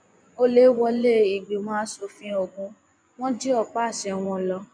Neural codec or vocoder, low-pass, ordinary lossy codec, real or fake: vocoder, 24 kHz, 100 mel bands, Vocos; 9.9 kHz; none; fake